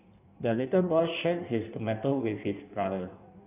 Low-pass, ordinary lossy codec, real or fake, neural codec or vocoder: 3.6 kHz; none; fake; codec, 16 kHz in and 24 kHz out, 1.1 kbps, FireRedTTS-2 codec